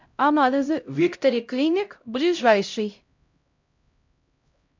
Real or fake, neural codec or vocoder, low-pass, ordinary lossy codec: fake; codec, 16 kHz, 0.5 kbps, X-Codec, HuBERT features, trained on LibriSpeech; 7.2 kHz; MP3, 64 kbps